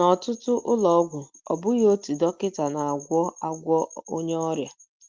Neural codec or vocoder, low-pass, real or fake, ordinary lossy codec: none; 7.2 kHz; real; Opus, 32 kbps